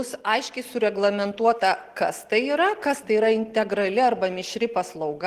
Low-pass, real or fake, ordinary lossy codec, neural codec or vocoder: 14.4 kHz; real; Opus, 16 kbps; none